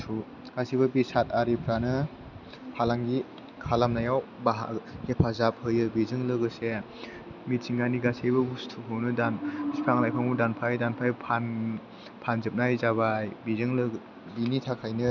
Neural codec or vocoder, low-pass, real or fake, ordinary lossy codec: none; 7.2 kHz; real; none